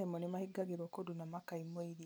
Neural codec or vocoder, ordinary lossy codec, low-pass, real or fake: none; none; none; real